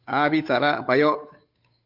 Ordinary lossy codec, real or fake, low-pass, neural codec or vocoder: MP3, 48 kbps; fake; 5.4 kHz; codec, 16 kHz, 8 kbps, FunCodec, trained on Chinese and English, 25 frames a second